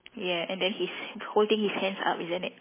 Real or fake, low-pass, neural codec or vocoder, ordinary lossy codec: real; 3.6 kHz; none; MP3, 16 kbps